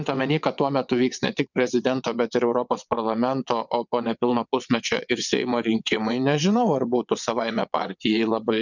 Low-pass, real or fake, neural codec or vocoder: 7.2 kHz; fake; vocoder, 22.05 kHz, 80 mel bands, WaveNeXt